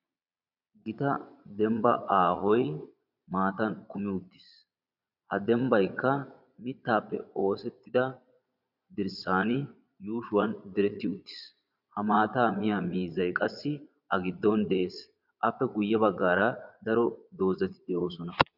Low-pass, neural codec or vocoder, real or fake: 5.4 kHz; vocoder, 22.05 kHz, 80 mel bands, Vocos; fake